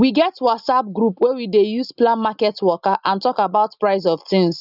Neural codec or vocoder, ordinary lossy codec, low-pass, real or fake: none; none; 5.4 kHz; real